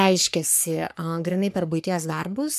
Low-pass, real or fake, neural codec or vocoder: 14.4 kHz; fake; codec, 44.1 kHz, 3.4 kbps, Pupu-Codec